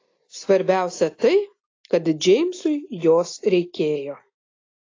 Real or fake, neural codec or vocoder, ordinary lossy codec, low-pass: real; none; AAC, 32 kbps; 7.2 kHz